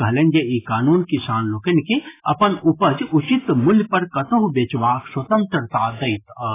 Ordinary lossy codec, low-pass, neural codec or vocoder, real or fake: AAC, 16 kbps; 3.6 kHz; none; real